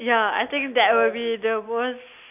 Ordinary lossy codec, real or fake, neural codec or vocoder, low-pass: none; real; none; 3.6 kHz